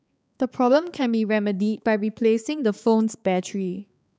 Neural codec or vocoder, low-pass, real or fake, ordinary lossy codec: codec, 16 kHz, 4 kbps, X-Codec, HuBERT features, trained on balanced general audio; none; fake; none